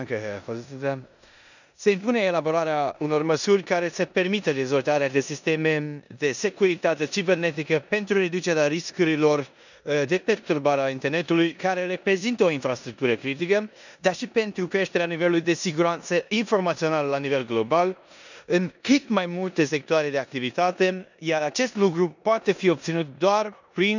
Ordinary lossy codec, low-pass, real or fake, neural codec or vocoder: none; 7.2 kHz; fake; codec, 16 kHz in and 24 kHz out, 0.9 kbps, LongCat-Audio-Codec, four codebook decoder